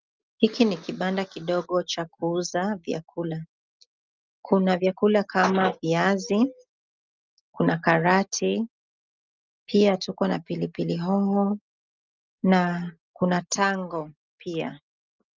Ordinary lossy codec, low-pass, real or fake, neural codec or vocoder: Opus, 24 kbps; 7.2 kHz; real; none